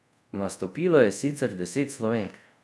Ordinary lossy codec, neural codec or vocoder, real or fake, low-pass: none; codec, 24 kHz, 0.5 kbps, DualCodec; fake; none